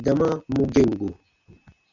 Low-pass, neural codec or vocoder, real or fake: 7.2 kHz; none; real